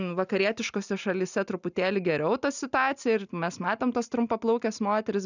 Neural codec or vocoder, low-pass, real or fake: none; 7.2 kHz; real